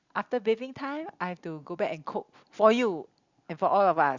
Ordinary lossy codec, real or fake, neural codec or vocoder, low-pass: Opus, 64 kbps; real; none; 7.2 kHz